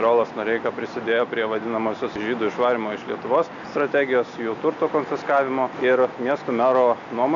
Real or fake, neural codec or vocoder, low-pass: real; none; 7.2 kHz